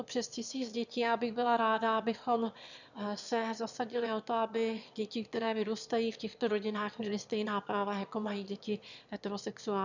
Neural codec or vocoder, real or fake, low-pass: autoencoder, 22.05 kHz, a latent of 192 numbers a frame, VITS, trained on one speaker; fake; 7.2 kHz